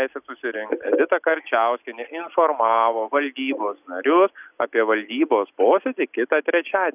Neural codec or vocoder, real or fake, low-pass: none; real; 3.6 kHz